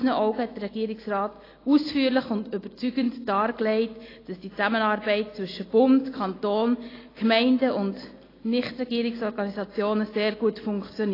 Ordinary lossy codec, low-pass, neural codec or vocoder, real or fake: AAC, 24 kbps; 5.4 kHz; none; real